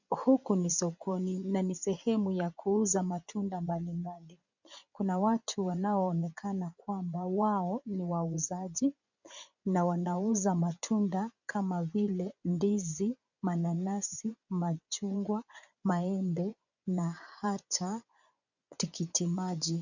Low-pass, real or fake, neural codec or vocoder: 7.2 kHz; fake; vocoder, 24 kHz, 100 mel bands, Vocos